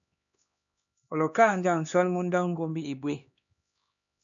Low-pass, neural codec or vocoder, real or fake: 7.2 kHz; codec, 16 kHz, 2 kbps, X-Codec, HuBERT features, trained on LibriSpeech; fake